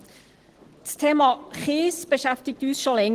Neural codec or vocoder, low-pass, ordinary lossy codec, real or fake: none; 14.4 kHz; Opus, 16 kbps; real